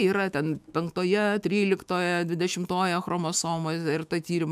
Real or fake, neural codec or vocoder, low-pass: fake; autoencoder, 48 kHz, 128 numbers a frame, DAC-VAE, trained on Japanese speech; 14.4 kHz